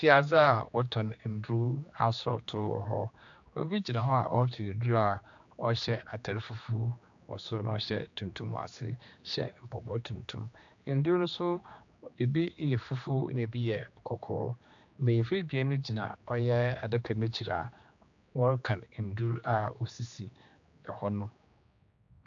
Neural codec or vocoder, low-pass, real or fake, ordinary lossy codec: codec, 16 kHz, 2 kbps, X-Codec, HuBERT features, trained on general audio; 7.2 kHz; fake; AAC, 64 kbps